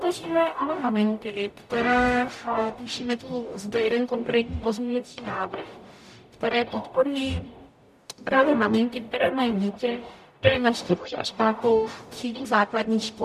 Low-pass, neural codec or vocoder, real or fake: 14.4 kHz; codec, 44.1 kHz, 0.9 kbps, DAC; fake